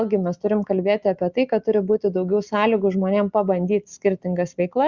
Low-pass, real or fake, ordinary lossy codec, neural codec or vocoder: 7.2 kHz; real; Opus, 64 kbps; none